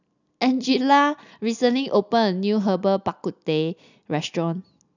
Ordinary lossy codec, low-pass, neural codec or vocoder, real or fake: none; 7.2 kHz; none; real